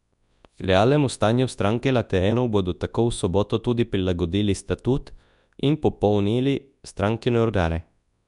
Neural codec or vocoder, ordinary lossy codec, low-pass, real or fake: codec, 24 kHz, 0.9 kbps, WavTokenizer, large speech release; none; 10.8 kHz; fake